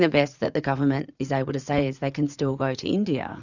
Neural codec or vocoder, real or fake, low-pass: vocoder, 44.1 kHz, 80 mel bands, Vocos; fake; 7.2 kHz